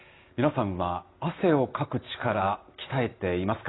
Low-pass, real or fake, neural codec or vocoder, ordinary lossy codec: 7.2 kHz; real; none; AAC, 16 kbps